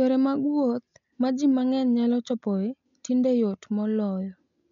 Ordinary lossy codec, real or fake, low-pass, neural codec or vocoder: MP3, 96 kbps; real; 7.2 kHz; none